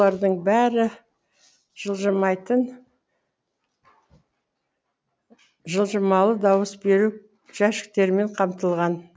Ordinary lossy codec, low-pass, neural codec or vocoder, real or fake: none; none; none; real